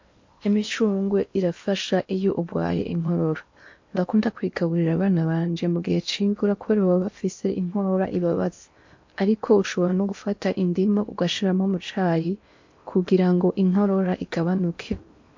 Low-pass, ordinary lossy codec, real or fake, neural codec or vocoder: 7.2 kHz; MP3, 48 kbps; fake; codec, 16 kHz in and 24 kHz out, 0.8 kbps, FocalCodec, streaming, 65536 codes